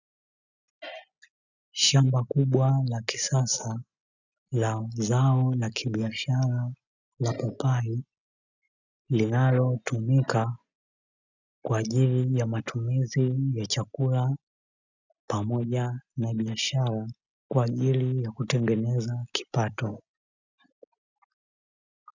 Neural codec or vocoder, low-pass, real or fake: none; 7.2 kHz; real